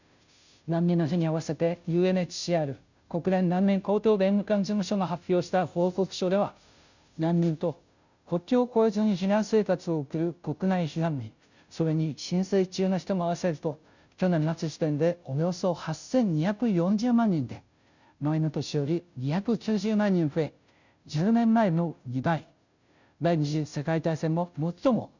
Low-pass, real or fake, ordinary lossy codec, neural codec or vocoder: 7.2 kHz; fake; none; codec, 16 kHz, 0.5 kbps, FunCodec, trained on Chinese and English, 25 frames a second